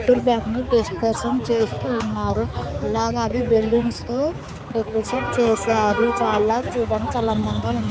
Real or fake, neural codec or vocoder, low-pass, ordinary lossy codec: fake; codec, 16 kHz, 4 kbps, X-Codec, HuBERT features, trained on balanced general audio; none; none